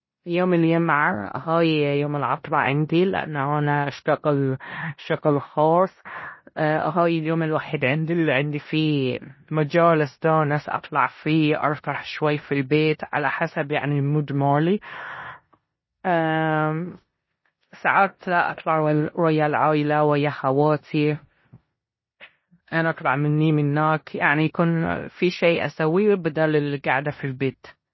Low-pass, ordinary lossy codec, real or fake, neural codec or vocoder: 7.2 kHz; MP3, 24 kbps; fake; codec, 16 kHz in and 24 kHz out, 0.9 kbps, LongCat-Audio-Codec, four codebook decoder